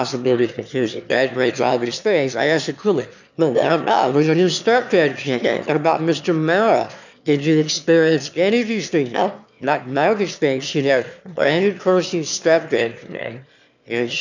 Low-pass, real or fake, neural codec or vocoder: 7.2 kHz; fake; autoencoder, 22.05 kHz, a latent of 192 numbers a frame, VITS, trained on one speaker